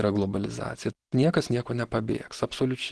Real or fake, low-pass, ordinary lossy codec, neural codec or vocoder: real; 10.8 kHz; Opus, 16 kbps; none